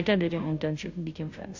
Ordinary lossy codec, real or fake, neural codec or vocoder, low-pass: AAC, 48 kbps; fake; codec, 16 kHz, 0.5 kbps, FunCodec, trained on Chinese and English, 25 frames a second; 7.2 kHz